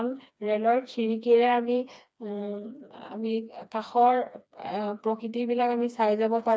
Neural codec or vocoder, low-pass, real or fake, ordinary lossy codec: codec, 16 kHz, 2 kbps, FreqCodec, smaller model; none; fake; none